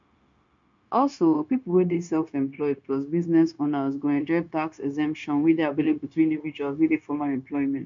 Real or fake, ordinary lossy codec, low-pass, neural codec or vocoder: fake; MP3, 64 kbps; 7.2 kHz; codec, 16 kHz, 0.9 kbps, LongCat-Audio-Codec